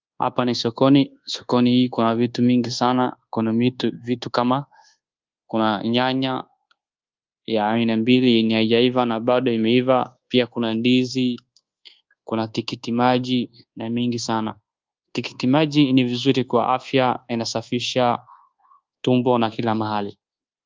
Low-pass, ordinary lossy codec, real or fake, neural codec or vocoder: 7.2 kHz; Opus, 24 kbps; fake; codec, 24 kHz, 1.2 kbps, DualCodec